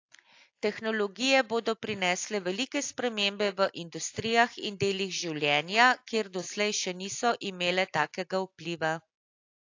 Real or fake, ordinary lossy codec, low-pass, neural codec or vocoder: real; AAC, 48 kbps; 7.2 kHz; none